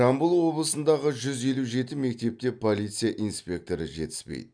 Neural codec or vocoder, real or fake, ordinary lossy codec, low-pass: none; real; none; 9.9 kHz